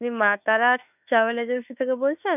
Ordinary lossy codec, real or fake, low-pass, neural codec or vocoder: none; fake; 3.6 kHz; autoencoder, 48 kHz, 32 numbers a frame, DAC-VAE, trained on Japanese speech